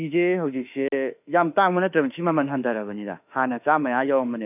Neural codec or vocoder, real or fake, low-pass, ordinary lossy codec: autoencoder, 48 kHz, 32 numbers a frame, DAC-VAE, trained on Japanese speech; fake; 3.6 kHz; none